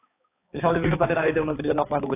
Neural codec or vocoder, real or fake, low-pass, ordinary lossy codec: codec, 16 kHz, 4 kbps, X-Codec, HuBERT features, trained on balanced general audio; fake; 3.6 kHz; AAC, 16 kbps